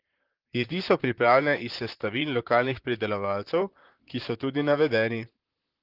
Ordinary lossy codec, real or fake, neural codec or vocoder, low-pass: Opus, 16 kbps; fake; vocoder, 44.1 kHz, 128 mel bands, Pupu-Vocoder; 5.4 kHz